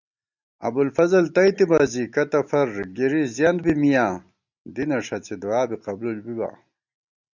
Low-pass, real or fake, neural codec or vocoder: 7.2 kHz; real; none